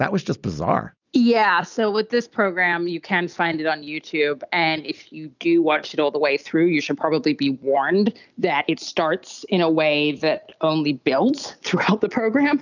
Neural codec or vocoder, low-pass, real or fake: none; 7.2 kHz; real